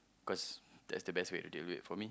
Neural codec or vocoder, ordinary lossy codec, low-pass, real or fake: none; none; none; real